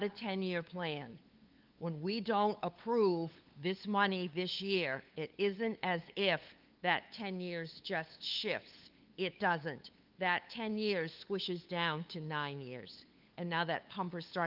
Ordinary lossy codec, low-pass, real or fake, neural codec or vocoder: Opus, 24 kbps; 5.4 kHz; fake; codec, 16 kHz, 8 kbps, FunCodec, trained on LibriTTS, 25 frames a second